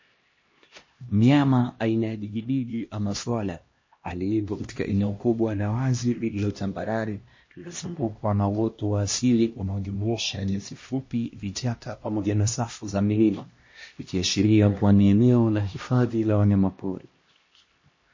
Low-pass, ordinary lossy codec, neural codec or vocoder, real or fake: 7.2 kHz; MP3, 32 kbps; codec, 16 kHz, 1 kbps, X-Codec, HuBERT features, trained on LibriSpeech; fake